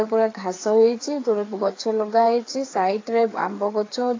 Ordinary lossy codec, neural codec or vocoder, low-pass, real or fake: AAC, 32 kbps; codec, 16 kHz, 4 kbps, FreqCodec, larger model; 7.2 kHz; fake